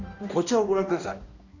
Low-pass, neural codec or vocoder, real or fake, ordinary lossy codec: 7.2 kHz; codec, 16 kHz, 1 kbps, X-Codec, HuBERT features, trained on balanced general audio; fake; none